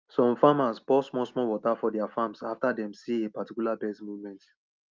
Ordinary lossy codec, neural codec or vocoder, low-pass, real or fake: Opus, 24 kbps; none; 7.2 kHz; real